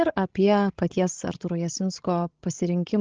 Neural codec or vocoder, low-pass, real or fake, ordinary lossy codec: codec, 16 kHz, 16 kbps, FreqCodec, larger model; 7.2 kHz; fake; Opus, 16 kbps